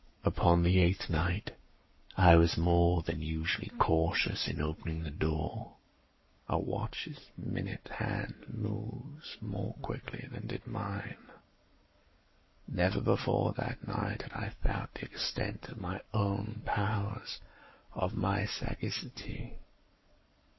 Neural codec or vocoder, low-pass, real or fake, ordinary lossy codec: codec, 44.1 kHz, 7.8 kbps, Pupu-Codec; 7.2 kHz; fake; MP3, 24 kbps